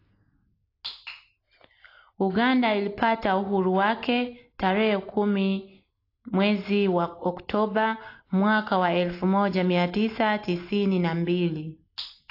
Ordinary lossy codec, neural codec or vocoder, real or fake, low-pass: AAC, 32 kbps; none; real; 5.4 kHz